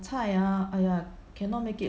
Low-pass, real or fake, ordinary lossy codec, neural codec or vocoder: none; real; none; none